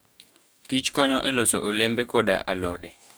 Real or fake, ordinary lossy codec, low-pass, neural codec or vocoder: fake; none; none; codec, 44.1 kHz, 2.6 kbps, DAC